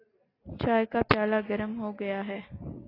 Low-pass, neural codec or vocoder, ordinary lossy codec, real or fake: 5.4 kHz; none; AAC, 24 kbps; real